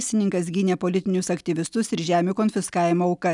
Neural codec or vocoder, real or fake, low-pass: none; real; 10.8 kHz